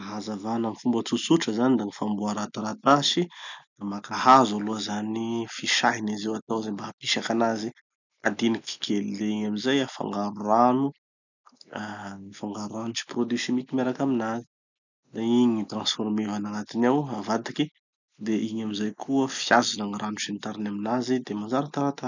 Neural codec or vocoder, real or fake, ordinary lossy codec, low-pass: none; real; none; 7.2 kHz